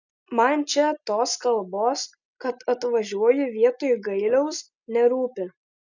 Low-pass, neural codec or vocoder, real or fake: 7.2 kHz; none; real